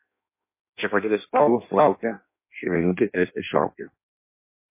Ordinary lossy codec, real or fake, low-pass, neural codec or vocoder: MP3, 24 kbps; fake; 3.6 kHz; codec, 16 kHz in and 24 kHz out, 0.6 kbps, FireRedTTS-2 codec